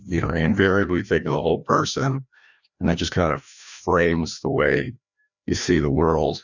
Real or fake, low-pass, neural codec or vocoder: fake; 7.2 kHz; codec, 16 kHz, 1 kbps, FreqCodec, larger model